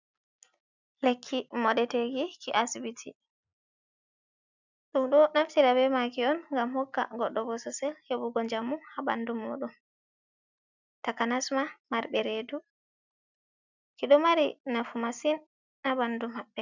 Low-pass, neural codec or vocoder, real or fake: 7.2 kHz; none; real